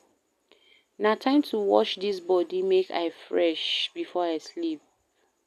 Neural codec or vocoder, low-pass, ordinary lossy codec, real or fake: none; 14.4 kHz; none; real